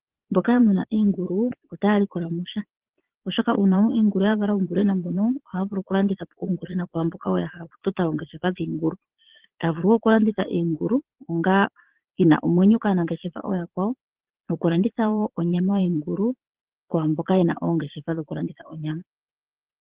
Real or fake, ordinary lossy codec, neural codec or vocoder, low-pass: fake; Opus, 16 kbps; vocoder, 22.05 kHz, 80 mel bands, Vocos; 3.6 kHz